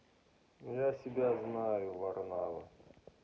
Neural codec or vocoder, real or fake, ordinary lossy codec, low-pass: none; real; none; none